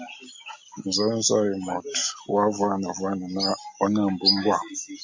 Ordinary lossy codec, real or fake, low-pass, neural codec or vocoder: MP3, 64 kbps; real; 7.2 kHz; none